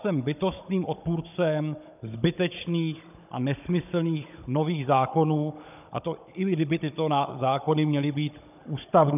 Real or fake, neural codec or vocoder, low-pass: fake; codec, 16 kHz, 16 kbps, FunCodec, trained on Chinese and English, 50 frames a second; 3.6 kHz